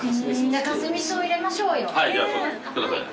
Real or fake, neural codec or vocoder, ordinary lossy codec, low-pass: real; none; none; none